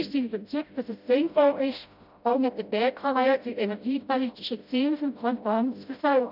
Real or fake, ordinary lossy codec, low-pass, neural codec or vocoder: fake; none; 5.4 kHz; codec, 16 kHz, 0.5 kbps, FreqCodec, smaller model